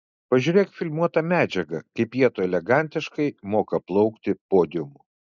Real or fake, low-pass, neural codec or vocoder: real; 7.2 kHz; none